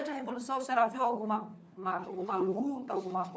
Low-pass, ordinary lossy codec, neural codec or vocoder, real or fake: none; none; codec, 16 kHz, 4 kbps, FunCodec, trained on LibriTTS, 50 frames a second; fake